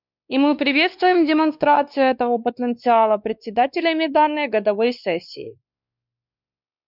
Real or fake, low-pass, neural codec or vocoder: fake; 5.4 kHz; codec, 16 kHz, 2 kbps, X-Codec, WavLM features, trained on Multilingual LibriSpeech